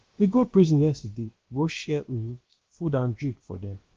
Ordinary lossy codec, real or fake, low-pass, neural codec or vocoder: Opus, 16 kbps; fake; 7.2 kHz; codec, 16 kHz, about 1 kbps, DyCAST, with the encoder's durations